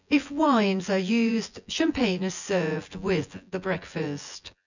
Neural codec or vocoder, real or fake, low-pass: vocoder, 24 kHz, 100 mel bands, Vocos; fake; 7.2 kHz